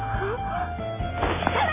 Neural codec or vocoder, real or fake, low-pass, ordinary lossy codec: none; real; 3.6 kHz; MP3, 16 kbps